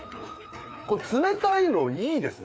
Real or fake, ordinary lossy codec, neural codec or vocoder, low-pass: fake; none; codec, 16 kHz, 4 kbps, FreqCodec, larger model; none